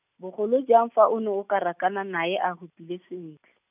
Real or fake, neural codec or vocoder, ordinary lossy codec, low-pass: real; none; none; 3.6 kHz